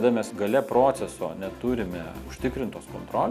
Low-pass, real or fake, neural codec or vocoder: 14.4 kHz; real; none